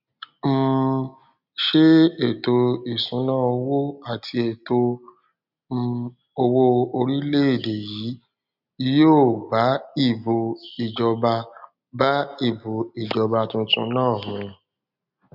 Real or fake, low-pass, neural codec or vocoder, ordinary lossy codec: real; 5.4 kHz; none; none